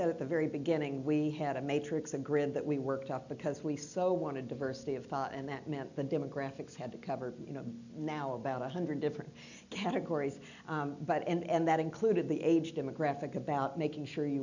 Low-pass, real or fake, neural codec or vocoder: 7.2 kHz; real; none